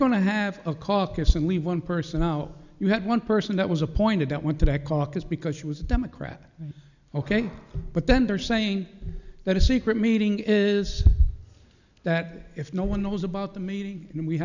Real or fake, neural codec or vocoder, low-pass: real; none; 7.2 kHz